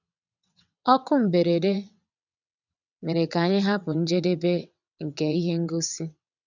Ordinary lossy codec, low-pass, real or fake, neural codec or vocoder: none; 7.2 kHz; fake; vocoder, 22.05 kHz, 80 mel bands, WaveNeXt